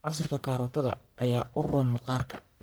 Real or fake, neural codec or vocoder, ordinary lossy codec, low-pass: fake; codec, 44.1 kHz, 1.7 kbps, Pupu-Codec; none; none